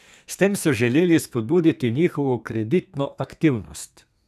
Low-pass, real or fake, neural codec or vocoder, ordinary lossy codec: 14.4 kHz; fake; codec, 32 kHz, 1.9 kbps, SNAC; none